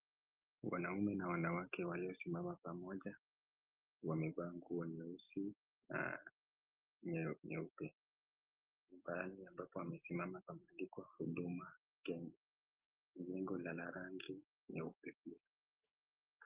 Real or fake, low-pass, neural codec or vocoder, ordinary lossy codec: real; 3.6 kHz; none; Opus, 32 kbps